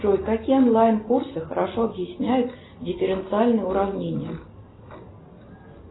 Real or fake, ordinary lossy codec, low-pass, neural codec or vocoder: real; AAC, 16 kbps; 7.2 kHz; none